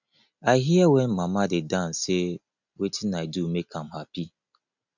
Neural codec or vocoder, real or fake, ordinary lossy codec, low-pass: none; real; none; 7.2 kHz